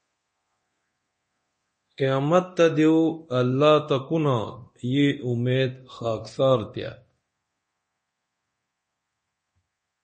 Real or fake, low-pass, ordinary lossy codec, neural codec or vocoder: fake; 10.8 kHz; MP3, 32 kbps; codec, 24 kHz, 0.9 kbps, DualCodec